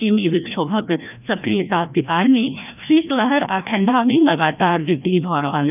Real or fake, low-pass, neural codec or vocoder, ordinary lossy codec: fake; 3.6 kHz; codec, 16 kHz, 1 kbps, FreqCodec, larger model; none